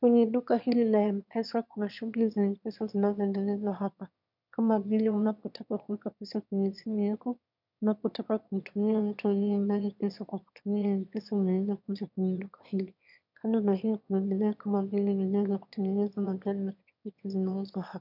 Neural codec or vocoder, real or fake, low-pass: autoencoder, 22.05 kHz, a latent of 192 numbers a frame, VITS, trained on one speaker; fake; 5.4 kHz